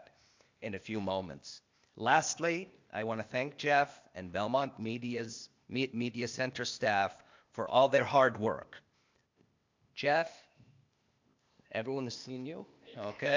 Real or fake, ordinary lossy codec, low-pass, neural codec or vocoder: fake; MP3, 64 kbps; 7.2 kHz; codec, 16 kHz, 0.8 kbps, ZipCodec